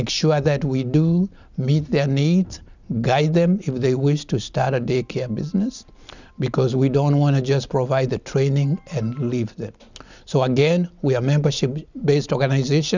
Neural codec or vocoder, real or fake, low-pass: none; real; 7.2 kHz